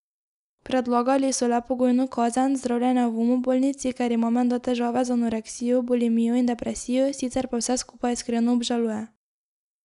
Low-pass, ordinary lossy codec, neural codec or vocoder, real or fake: 10.8 kHz; none; none; real